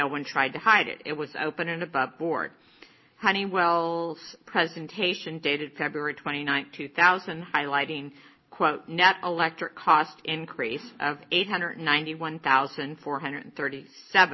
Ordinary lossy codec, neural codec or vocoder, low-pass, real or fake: MP3, 24 kbps; none; 7.2 kHz; real